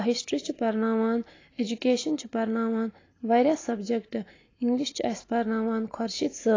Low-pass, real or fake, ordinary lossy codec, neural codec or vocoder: 7.2 kHz; real; AAC, 32 kbps; none